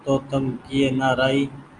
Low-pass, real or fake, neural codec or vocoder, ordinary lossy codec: 10.8 kHz; real; none; Opus, 32 kbps